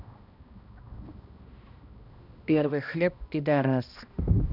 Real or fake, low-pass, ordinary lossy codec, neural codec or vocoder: fake; 5.4 kHz; none; codec, 16 kHz, 1 kbps, X-Codec, HuBERT features, trained on balanced general audio